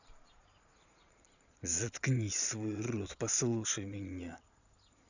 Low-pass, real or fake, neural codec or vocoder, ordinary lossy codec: 7.2 kHz; fake; vocoder, 22.05 kHz, 80 mel bands, Vocos; none